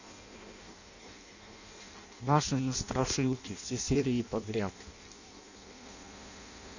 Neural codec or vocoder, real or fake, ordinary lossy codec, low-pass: codec, 16 kHz in and 24 kHz out, 0.6 kbps, FireRedTTS-2 codec; fake; none; 7.2 kHz